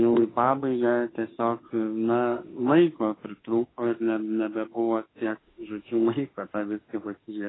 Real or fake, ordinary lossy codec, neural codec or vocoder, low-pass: fake; AAC, 16 kbps; autoencoder, 48 kHz, 32 numbers a frame, DAC-VAE, trained on Japanese speech; 7.2 kHz